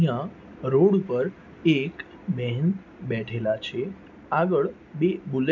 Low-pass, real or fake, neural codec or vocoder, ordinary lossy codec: 7.2 kHz; real; none; MP3, 64 kbps